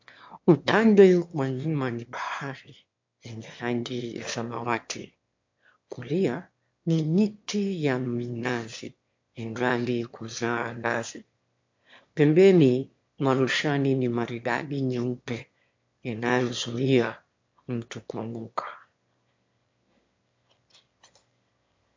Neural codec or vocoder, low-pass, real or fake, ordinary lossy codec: autoencoder, 22.05 kHz, a latent of 192 numbers a frame, VITS, trained on one speaker; 7.2 kHz; fake; MP3, 48 kbps